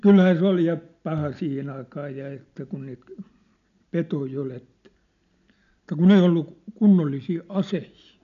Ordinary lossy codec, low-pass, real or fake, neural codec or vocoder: none; 7.2 kHz; real; none